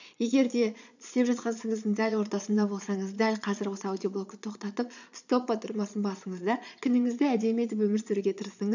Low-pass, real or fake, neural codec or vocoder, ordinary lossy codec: 7.2 kHz; fake; vocoder, 22.05 kHz, 80 mel bands, Vocos; none